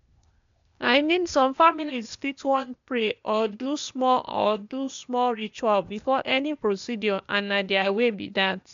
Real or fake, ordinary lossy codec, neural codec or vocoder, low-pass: fake; MP3, 64 kbps; codec, 16 kHz, 0.8 kbps, ZipCodec; 7.2 kHz